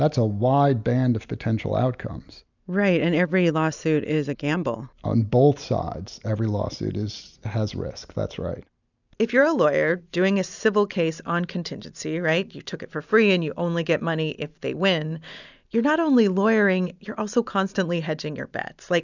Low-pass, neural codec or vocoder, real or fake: 7.2 kHz; none; real